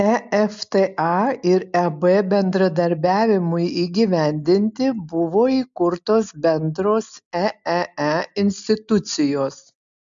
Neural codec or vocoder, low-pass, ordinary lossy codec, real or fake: none; 7.2 kHz; MP3, 64 kbps; real